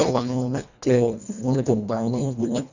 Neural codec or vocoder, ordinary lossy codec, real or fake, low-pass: codec, 24 kHz, 1.5 kbps, HILCodec; none; fake; 7.2 kHz